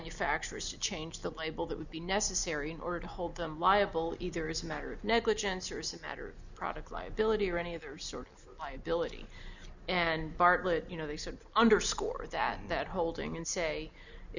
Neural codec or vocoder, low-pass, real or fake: none; 7.2 kHz; real